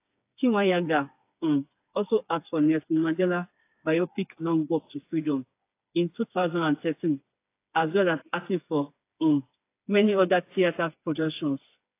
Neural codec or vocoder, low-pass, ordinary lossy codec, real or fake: codec, 16 kHz, 4 kbps, FreqCodec, smaller model; 3.6 kHz; AAC, 24 kbps; fake